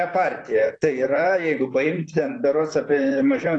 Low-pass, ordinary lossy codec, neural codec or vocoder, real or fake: 9.9 kHz; AAC, 48 kbps; vocoder, 44.1 kHz, 128 mel bands, Pupu-Vocoder; fake